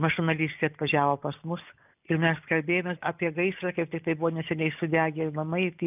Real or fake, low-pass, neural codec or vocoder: real; 3.6 kHz; none